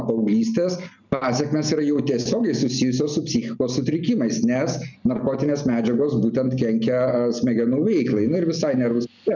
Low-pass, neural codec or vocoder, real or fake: 7.2 kHz; none; real